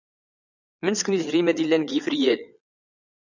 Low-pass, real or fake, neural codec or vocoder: 7.2 kHz; fake; vocoder, 22.05 kHz, 80 mel bands, Vocos